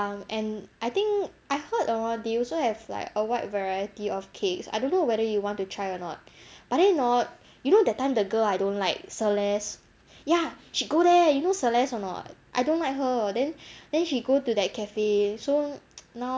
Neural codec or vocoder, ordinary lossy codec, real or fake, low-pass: none; none; real; none